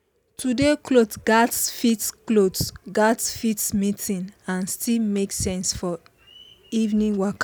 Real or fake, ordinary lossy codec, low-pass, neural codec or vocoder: real; none; none; none